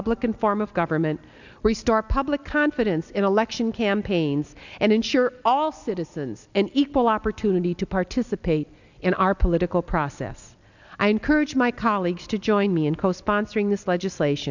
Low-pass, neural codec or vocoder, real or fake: 7.2 kHz; none; real